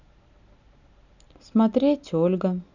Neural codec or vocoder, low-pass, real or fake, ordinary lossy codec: none; 7.2 kHz; real; none